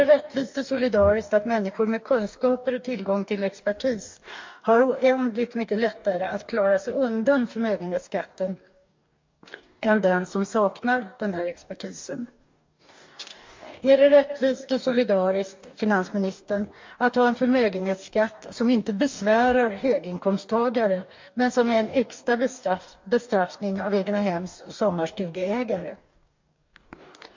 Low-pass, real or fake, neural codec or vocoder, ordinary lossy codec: 7.2 kHz; fake; codec, 44.1 kHz, 2.6 kbps, DAC; MP3, 48 kbps